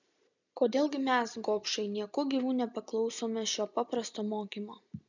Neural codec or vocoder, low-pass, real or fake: none; 7.2 kHz; real